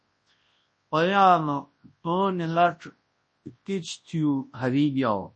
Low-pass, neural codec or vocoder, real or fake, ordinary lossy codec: 10.8 kHz; codec, 24 kHz, 0.9 kbps, WavTokenizer, large speech release; fake; MP3, 32 kbps